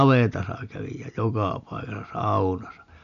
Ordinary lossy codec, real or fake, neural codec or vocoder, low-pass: none; real; none; 7.2 kHz